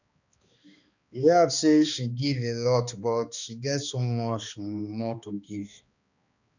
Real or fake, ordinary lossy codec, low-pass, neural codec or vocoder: fake; none; 7.2 kHz; codec, 16 kHz, 2 kbps, X-Codec, HuBERT features, trained on balanced general audio